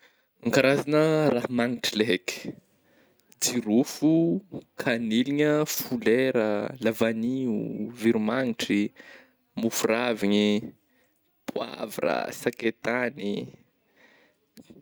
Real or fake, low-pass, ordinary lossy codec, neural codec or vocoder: real; none; none; none